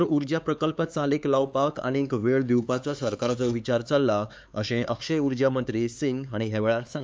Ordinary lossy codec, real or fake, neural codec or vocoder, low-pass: none; fake; codec, 16 kHz, 4 kbps, X-Codec, HuBERT features, trained on LibriSpeech; none